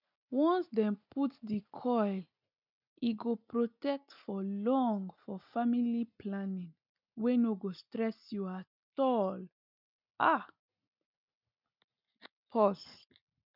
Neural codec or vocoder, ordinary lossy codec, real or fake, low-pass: none; none; real; 5.4 kHz